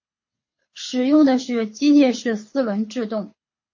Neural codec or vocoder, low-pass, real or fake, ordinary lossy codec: codec, 24 kHz, 6 kbps, HILCodec; 7.2 kHz; fake; MP3, 32 kbps